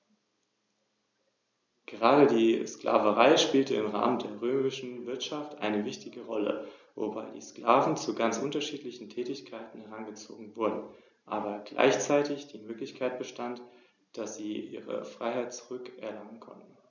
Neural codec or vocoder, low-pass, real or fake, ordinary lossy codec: none; 7.2 kHz; real; none